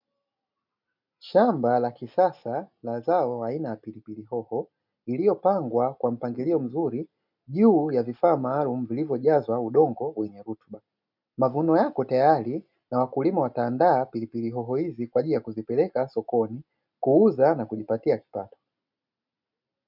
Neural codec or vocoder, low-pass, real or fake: none; 5.4 kHz; real